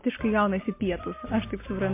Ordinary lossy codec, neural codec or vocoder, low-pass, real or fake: MP3, 24 kbps; none; 3.6 kHz; real